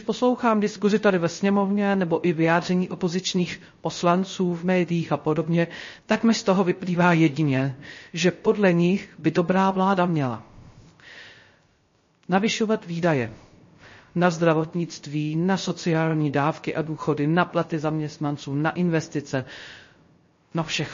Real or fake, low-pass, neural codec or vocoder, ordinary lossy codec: fake; 7.2 kHz; codec, 16 kHz, 0.3 kbps, FocalCodec; MP3, 32 kbps